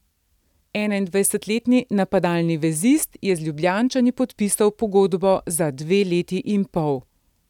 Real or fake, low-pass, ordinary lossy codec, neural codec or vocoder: real; 19.8 kHz; none; none